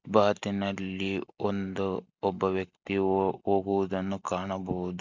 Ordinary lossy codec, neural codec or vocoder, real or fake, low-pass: none; none; real; 7.2 kHz